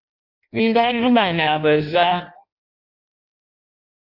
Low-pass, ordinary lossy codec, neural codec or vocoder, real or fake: 5.4 kHz; AAC, 48 kbps; codec, 16 kHz in and 24 kHz out, 0.6 kbps, FireRedTTS-2 codec; fake